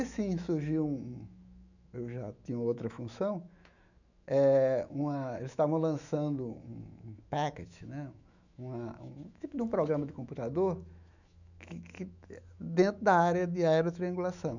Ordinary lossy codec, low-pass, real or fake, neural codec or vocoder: none; 7.2 kHz; fake; autoencoder, 48 kHz, 128 numbers a frame, DAC-VAE, trained on Japanese speech